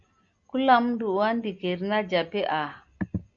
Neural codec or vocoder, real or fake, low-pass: none; real; 7.2 kHz